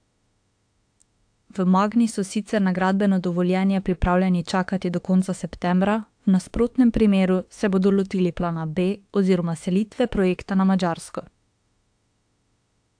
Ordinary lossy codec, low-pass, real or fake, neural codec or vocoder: AAC, 64 kbps; 9.9 kHz; fake; autoencoder, 48 kHz, 32 numbers a frame, DAC-VAE, trained on Japanese speech